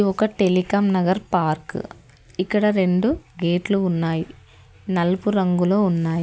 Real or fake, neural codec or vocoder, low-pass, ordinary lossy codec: real; none; none; none